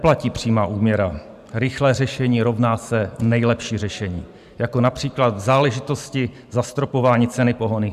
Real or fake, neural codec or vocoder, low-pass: fake; vocoder, 44.1 kHz, 128 mel bands every 512 samples, BigVGAN v2; 14.4 kHz